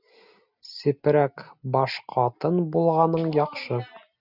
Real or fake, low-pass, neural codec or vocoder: real; 5.4 kHz; none